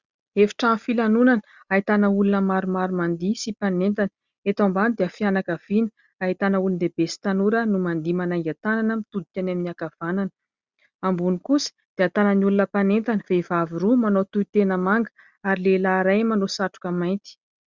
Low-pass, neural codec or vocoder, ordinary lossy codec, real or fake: 7.2 kHz; none; Opus, 64 kbps; real